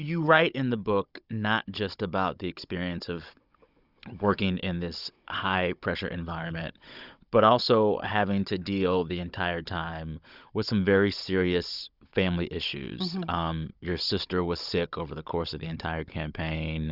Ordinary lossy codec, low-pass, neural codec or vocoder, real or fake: Opus, 64 kbps; 5.4 kHz; codec, 16 kHz, 16 kbps, FunCodec, trained on Chinese and English, 50 frames a second; fake